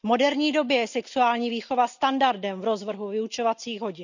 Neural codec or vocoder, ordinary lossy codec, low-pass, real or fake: none; none; 7.2 kHz; real